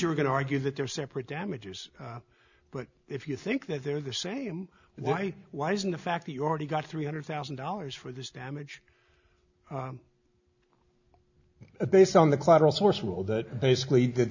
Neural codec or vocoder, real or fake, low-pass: none; real; 7.2 kHz